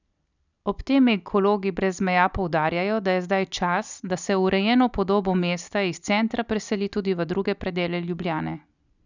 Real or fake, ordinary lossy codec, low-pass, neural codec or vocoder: real; none; 7.2 kHz; none